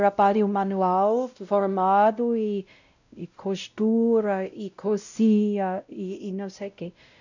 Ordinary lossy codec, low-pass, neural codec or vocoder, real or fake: none; 7.2 kHz; codec, 16 kHz, 0.5 kbps, X-Codec, WavLM features, trained on Multilingual LibriSpeech; fake